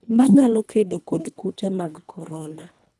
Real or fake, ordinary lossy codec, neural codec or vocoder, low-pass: fake; none; codec, 24 kHz, 1.5 kbps, HILCodec; none